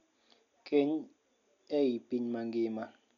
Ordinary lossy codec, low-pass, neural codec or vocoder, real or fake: none; 7.2 kHz; none; real